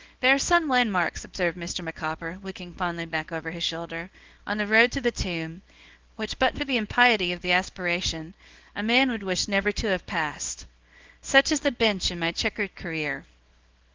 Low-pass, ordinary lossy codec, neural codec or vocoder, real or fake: 7.2 kHz; Opus, 16 kbps; codec, 24 kHz, 0.9 kbps, WavTokenizer, small release; fake